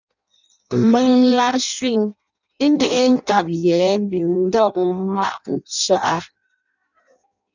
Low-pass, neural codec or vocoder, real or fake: 7.2 kHz; codec, 16 kHz in and 24 kHz out, 0.6 kbps, FireRedTTS-2 codec; fake